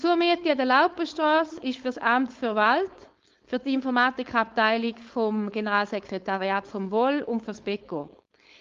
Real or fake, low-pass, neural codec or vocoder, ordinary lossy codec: fake; 7.2 kHz; codec, 16 kHz, 4.8 kbps, FACodec; Opus, 32 kbps